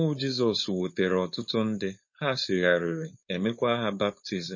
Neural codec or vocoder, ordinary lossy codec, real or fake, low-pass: codec, 16 kHz, 4.8 kbps, FACodec; MP3, 32 kbps; fake; 7.2 kHz